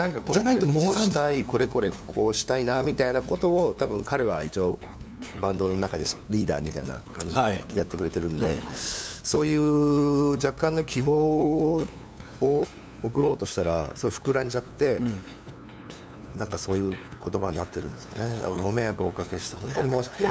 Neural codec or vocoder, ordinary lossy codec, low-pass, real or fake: codec, 16 kHz, 2 kbps, FunCodec, trained on LibriTTS, 25 frames a second; none; none; fake